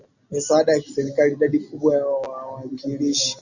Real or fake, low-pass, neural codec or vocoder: real; 7.2 kHz; none